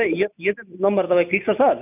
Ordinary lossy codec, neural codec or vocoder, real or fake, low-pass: none; none; real; 3.6 kHz